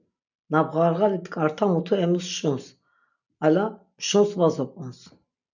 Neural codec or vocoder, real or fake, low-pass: none; real; 7.2 kHz